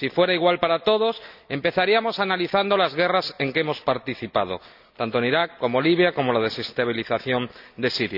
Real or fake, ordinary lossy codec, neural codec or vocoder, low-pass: real; none; none; 5.4 kHz